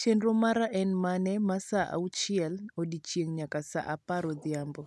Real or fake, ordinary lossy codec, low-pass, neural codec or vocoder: real; none; none; none